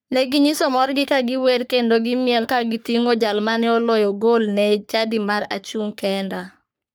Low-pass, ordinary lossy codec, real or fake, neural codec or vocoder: none; none; fake; codec, 44.1 kHz, 3.4 kbps, Pupu-Codec